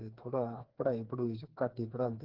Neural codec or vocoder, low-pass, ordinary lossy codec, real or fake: codec, 16 kHz, 4 kbps, FreqCodec, smaller model; 5.4 kHz; Opus, 16 kbps; fake